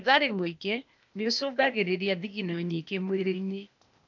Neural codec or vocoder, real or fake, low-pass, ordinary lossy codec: codec, 16 kHz, 0.8 kbps, ZipCodec; fake; 7.2 kHz; none